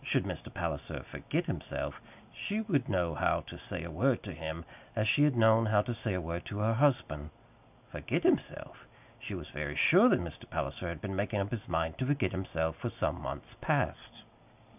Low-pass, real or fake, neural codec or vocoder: 3.6 kHz; real; none